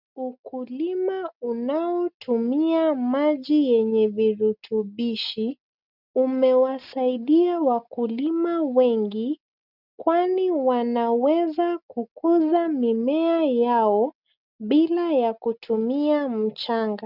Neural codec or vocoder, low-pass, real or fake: none; 5.4 kHz; real